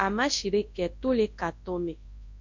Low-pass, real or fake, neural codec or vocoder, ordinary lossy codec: 7.2 kHz; fake; codec, 24 kHz, 0.9 kbps, WavTokenizer, large speech release; AAC, 48 kbps